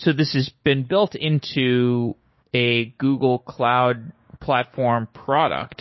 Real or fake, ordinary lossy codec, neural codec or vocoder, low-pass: fake; MP3, 24 kbps; codec, 16 kHz, 6 kbps, DAC; 7.2 kHz